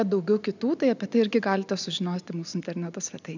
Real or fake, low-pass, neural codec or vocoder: real; 7.2 kHz; none